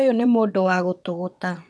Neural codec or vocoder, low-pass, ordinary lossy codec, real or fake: vocoder, 22.05 kHz, 80 mel bands, WaveNeXt; none; none; fake